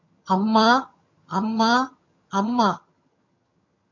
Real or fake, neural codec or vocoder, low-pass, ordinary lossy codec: fake; vocoder, 22.05 kHz, 80 mel bands, HiFi-GAN; 7.2 kHz; MP3, 48 kbps